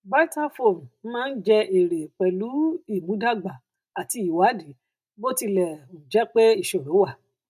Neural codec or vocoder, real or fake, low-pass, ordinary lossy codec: none; real; 14.4 kHz; none